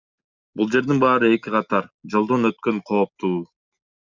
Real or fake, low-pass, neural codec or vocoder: real; 7.2 kHz; none